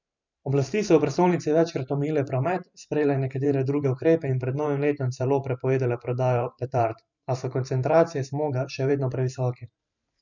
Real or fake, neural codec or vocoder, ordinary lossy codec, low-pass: fake; vocoder, 44.1 kHz, 128 mel bands every 512 samples, BigVGAN v2; none; 7.2 kHz